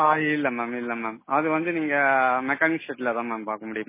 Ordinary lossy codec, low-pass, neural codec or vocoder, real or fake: MP3, 16 kbps; 3.6 kHz; none; real